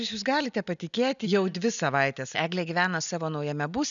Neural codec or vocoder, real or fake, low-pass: none; real; 7.2 kHz